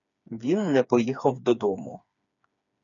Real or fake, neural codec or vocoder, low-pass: fake; codec, 16 kHz, 4 kbps, FreqCodec, smaller model; 7.2 kHz